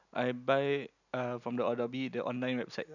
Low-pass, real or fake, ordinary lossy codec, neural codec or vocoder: 7.2 kHz; real; none; none